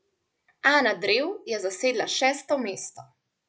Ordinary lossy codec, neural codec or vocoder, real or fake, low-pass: none; none; real; none